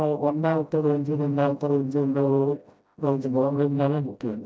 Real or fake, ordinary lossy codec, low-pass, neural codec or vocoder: fake; none; none; codec, 16 kHz, 0.5 kbps, FreqCodec, smaller model